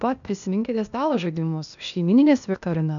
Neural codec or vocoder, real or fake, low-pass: codec, 16 kHz, 0.8 kbps, ZipCodec; fake; 7.2 kHz